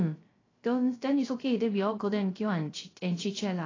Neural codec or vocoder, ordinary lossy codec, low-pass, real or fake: codec, 16 kHz, 0.3 kbps, FocalCodec; AAC, 32 kbps; 7.2 kHz; fake